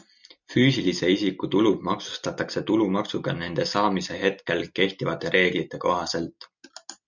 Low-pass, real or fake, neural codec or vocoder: 7.2 kHz; real; none